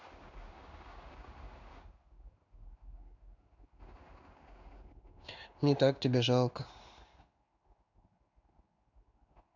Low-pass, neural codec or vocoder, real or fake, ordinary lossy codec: 7.2 kHz; codec, 16 kHz in and 24 kHz out, 1 kbps, XY-Tokenizer; fake; none